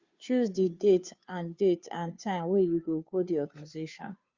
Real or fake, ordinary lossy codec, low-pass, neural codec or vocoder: fake; Opus, 64 kbps; 7.2 kHz; codec, 16 kHz, 2 kbps, FunCodec, trained on Chinese and English, 25 frames a second